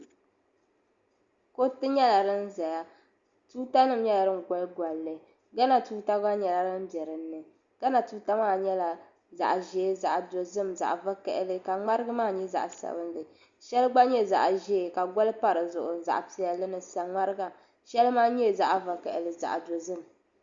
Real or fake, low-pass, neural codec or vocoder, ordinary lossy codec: real; 7.2 kHz; none; Opus, 64 kbps